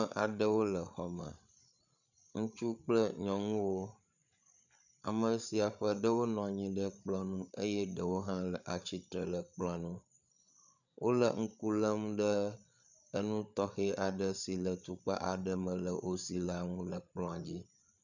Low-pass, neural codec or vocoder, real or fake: 7.2 kHz; codec, 16 kHz, 8 kbps, FreqCodec, larger model; fake